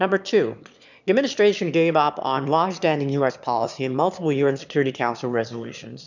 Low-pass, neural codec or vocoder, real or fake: 7.2 kHz; autoencoder, 22.05 kHz, a latent of 192 numbers a frame, VITS, trained on one speaker; fake